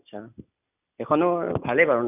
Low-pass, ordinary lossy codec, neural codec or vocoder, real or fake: 3.6 kHz; none; none; real